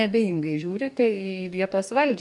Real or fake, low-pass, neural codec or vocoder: fake; 10.8 kHz; codec, 44.1 kHz, 2.6 kbps, DAC